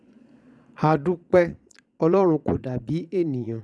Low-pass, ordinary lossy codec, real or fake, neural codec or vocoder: none; none; fake; vocoder, 22.05 kHz, 80 mel bands, Vocos